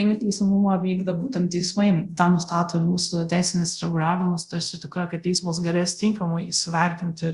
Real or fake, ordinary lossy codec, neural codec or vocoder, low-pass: fake; Opus, 64 kbps; codec, 24 kHz, 0.5 kbps, DualCodec; 10.8 kHz